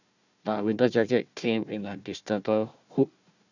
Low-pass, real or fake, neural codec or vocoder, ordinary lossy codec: 7.2 kHz; fake; codec, 16 kHz, 1 kbps, FunCodec, trained on Chinese and English, 50 frames a second; none